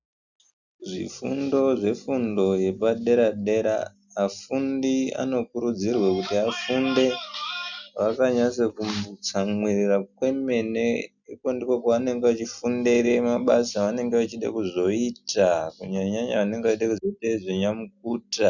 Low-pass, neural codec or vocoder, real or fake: 7.2 kHz; none; real